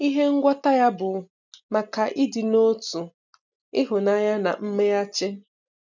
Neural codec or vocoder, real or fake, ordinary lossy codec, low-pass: none; real; none; 7.2 kHz